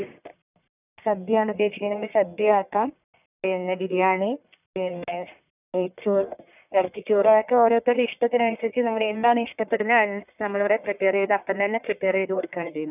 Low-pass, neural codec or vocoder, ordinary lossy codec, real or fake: 3.6 kHz; codec, 44.1 kHz, 1.7 kbps, Pupu-Codec; none; fake